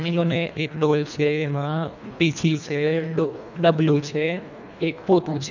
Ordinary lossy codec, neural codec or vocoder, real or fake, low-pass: none; codec, 24 kHz, 1.5 kbps, HILCodec; fake; 7.2 kHz